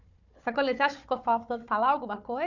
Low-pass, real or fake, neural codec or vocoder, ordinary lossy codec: 7.2 kHz; fake; codec, 16 kHz, 4 kbps, FunCodec, trained on Chinese and English, 50 frames a second; none